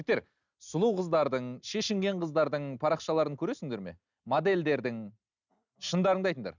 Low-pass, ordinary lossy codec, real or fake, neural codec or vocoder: 7.2 kHz; none; real; none